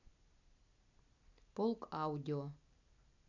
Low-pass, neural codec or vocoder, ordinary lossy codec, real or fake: 7.2 kHz; none; none; real